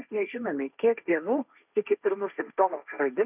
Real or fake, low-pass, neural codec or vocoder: fake; 3.6 kHz; codec, 16 kHz, 1.1 kbps, Voila-Tokenizer